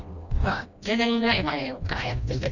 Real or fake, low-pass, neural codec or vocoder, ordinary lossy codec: fake; 7.2 kHz; codec, 16 kHz, 1 kbps, FreqCodec, smaller model; none